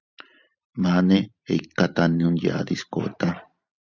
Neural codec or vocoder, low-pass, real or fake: vocoder, 44.1 kHz, 128 mel bands every 512 samples, BigVGAN v2; 7.2 kHz; fake